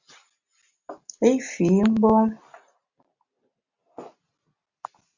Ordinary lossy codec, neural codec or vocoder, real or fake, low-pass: Opus, 64 kbps; none; real; 7.2 kHz